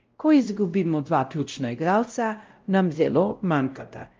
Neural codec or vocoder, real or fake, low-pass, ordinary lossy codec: codec, 16 kHz, 0.5 kbps, X-Codec, WavLM features, trained on Multilingual LibriSpeech; fake; 7.2 kHz; Opus, 24 kbps